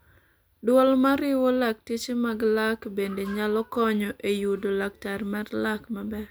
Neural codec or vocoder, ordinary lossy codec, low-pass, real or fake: none; none; none; real